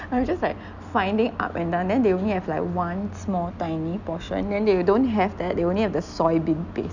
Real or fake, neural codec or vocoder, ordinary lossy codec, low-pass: real; none; none; 7.2 kHz